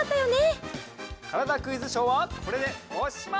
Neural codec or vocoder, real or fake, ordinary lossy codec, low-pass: none; real; none; none